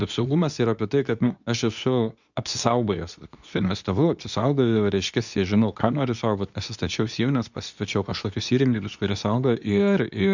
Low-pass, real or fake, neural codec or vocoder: 7.2 kHz; fake; codec, 24 kHz, 0.9 kbps, WavTokenizer, medium speech release version 2